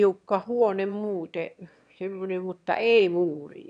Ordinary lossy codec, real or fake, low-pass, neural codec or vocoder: none; fake; 9.9 kHz; autoencoder, 22.05 kHz, a latent of 192 numbers a frame, VITS, trained on one speaker